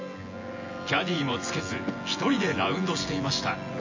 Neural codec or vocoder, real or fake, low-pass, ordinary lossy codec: vocoder, 24 kHz, 100 mel bands, Vocos; fake; 7.2 kHz; MP3, 32 kbps